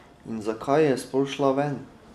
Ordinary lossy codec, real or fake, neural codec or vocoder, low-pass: none; real; none; 14.4 kHz